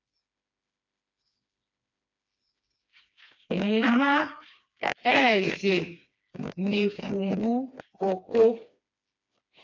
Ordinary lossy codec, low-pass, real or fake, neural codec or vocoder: AAC, 48 kbps; 7.2 kHz; fake; codec, 16 kHz, 2 kbps, FreqCodec, smaller model